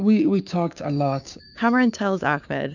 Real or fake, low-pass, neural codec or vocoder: fake; 7.2 kHz; codec, 16 kHz, 6 kbps, DAC